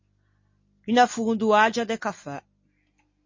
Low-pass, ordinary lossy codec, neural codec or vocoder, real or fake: 7.2 kHz; MP3, 32 kbps; none; real